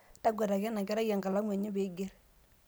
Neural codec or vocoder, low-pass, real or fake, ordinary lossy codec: none; none; real; none